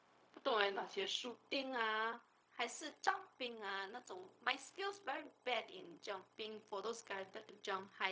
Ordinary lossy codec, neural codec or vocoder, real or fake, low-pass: none; codec, 16 kHz, 0.4 kbps, LongCat-Audio-Codec; fake; none